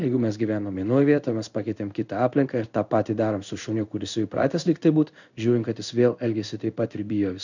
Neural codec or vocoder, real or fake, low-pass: codec, 16 kHz in and 24 kHz out, 1 kbps, XY-Tokenizer; fake; 7.2 kHz